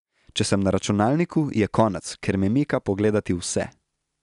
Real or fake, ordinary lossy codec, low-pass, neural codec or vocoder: real; none; 10.8 kHz; none